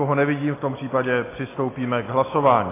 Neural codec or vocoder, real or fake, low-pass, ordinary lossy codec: none; real; 3.6 kHz; AAC, 16 kbps